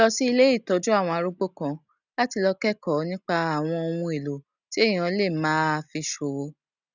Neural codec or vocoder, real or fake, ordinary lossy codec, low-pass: none; real; none; 7.2 kHz